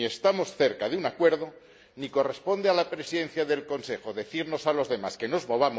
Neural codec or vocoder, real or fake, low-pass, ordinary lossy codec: none; real; none; none